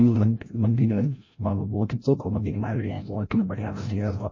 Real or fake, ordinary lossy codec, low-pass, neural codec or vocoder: fake; MP3, 32 kbps; 7.2 kHz; codec, 16 kHz, 0.5 kbps, FreqCodec, larger model